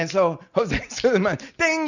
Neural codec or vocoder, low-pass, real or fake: none; 7.2 kHz; real